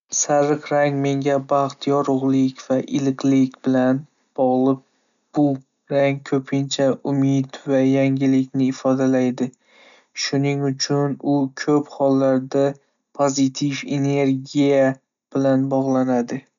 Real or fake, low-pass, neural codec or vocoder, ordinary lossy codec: real; 7.2 kHz; none; none